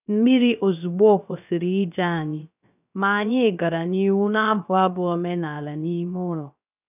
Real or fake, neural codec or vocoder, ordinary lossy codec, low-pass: fake; codec, 16 kHz, 0.3 kbps, FocalCodec; none; 3.6 kHz